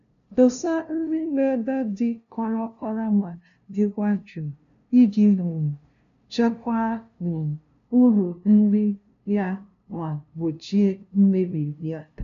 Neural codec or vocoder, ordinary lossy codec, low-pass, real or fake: codec, 16 kHz, 0.5 kbps, FunCodec, trained on LibriTTS, 25 frames a second; none; 7.2 kHz; fake